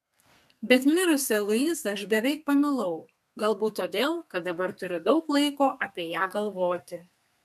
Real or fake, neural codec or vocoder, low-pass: fake; codec, 32 kHz, 1.9 kbps, SNAC; 14.4 kHz